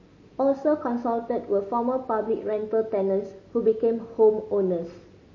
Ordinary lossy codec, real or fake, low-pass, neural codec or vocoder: MP3, 32 kbps; real; 7.2 kHz; none